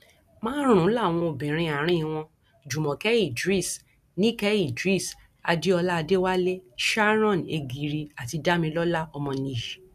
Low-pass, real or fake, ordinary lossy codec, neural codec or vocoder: 14.4 kHz; real; none; none